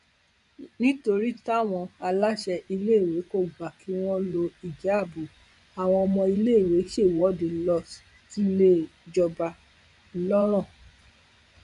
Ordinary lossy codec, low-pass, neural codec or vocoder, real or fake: none; 10.8 kHz; vocoder, 24 kHz, 100 mel bands, Vocos; fake